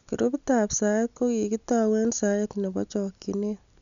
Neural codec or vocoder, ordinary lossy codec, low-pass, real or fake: none; none; 7.2 kHz; real